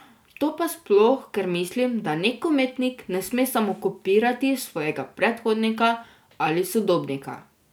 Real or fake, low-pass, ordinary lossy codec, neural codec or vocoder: real; none; none; none